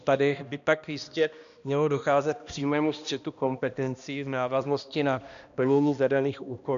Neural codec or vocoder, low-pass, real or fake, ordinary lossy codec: codec, 16 kHz, 1 kbps, X-Codec, HuBERT features, trained on balanced general audio; 7.2 kHz; fake; MP3, 96 kbps